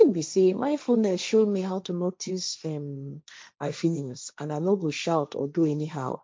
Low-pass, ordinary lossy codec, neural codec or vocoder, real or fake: none; none; codec, 16 kHz, 1.1 kbps, Voila-Tokenizer; fake